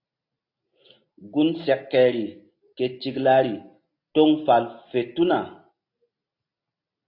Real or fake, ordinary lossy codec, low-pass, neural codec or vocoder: real; AAC, 32 kbps; 5.4 kHz; none